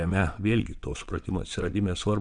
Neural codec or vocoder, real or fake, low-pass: vocoder, 22.05 kHz, 80 mel bands, WaveNeXt; fake; 9.9 kHz